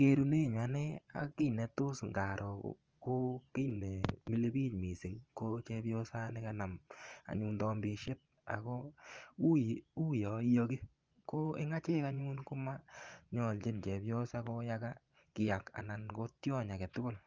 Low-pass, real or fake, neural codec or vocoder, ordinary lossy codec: 7.2 kHz; fake; vocoder, 24 kHz, 100 mel bands, Vocos; Opus, 24 kbps